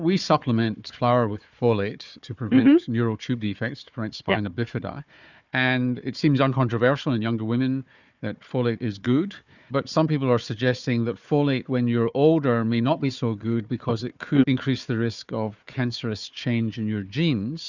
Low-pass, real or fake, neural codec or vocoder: 7.2 kHz; fake; codec, 16 kHz, 4 kbps, FunCodec, trained on Chinese and English, 50 frames a second